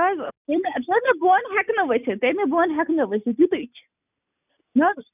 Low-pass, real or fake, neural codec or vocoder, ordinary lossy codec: 3.6 kHz; real; none; none